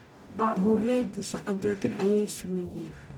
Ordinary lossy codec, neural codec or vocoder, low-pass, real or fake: none; codec, 44.1 kHz, 0.9 kbps, DAC; 19.8 kHz; fake